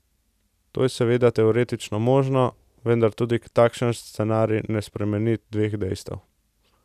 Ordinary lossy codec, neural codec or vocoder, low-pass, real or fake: none; none; 14.4 kHz; real